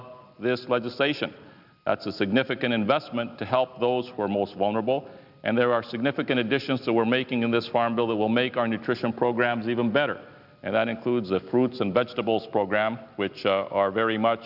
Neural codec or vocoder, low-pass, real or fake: none; 5.4 kHz; real